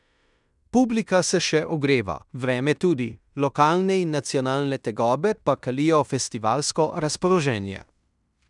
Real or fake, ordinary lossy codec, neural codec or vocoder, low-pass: fake; none; codec, 16 kHz in and 24 kHz out, 0.9 kbps, LongCat-Audio-Codec, fine tuned four codebook decoder; 10.8 kHz